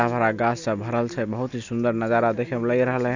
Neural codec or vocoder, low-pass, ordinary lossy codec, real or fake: none; 7.2 kHz; none; real